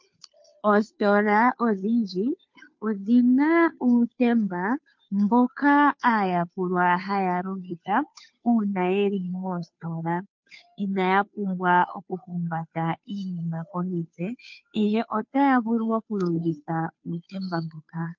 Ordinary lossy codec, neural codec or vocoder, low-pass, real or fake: MP3, 48 kbps; codec, 16 kHz, 2 kbps, FunCodec, trained on Chinese and English, 25 frames a second; 7.2 kHz; fake